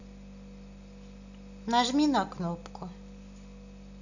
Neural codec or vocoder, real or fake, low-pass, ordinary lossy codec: none; real; 7.2 kHz; none